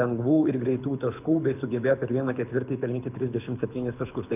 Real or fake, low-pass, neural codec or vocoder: fake; 3.6 kHz; codec, 24 kHz, 6 kbps, HILCodec